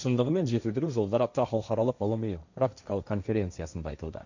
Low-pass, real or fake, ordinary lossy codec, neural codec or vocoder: 7.2 kHz; fake; none; codec, 16 kHz, 1.1 kbps, Voila-Tokenizer